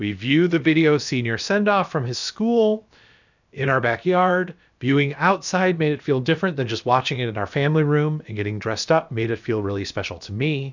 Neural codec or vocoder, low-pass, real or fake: codec, 16 kHz, about 1 kbps, DyCAST, with the encoder's durations; 7.2 kHz; fake